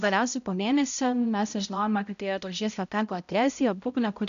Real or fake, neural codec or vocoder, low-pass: fake; codec, 16 kHz, 0.5 kbps, X-Codec, HuBERT features, trained on balanced general audio; 7.2 kHz